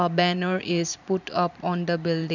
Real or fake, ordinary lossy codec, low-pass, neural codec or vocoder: fake; none; 7.2 kHz; vocoder, 44.1 kHz, 128 mel bands every 512 samples, BigVGAN v2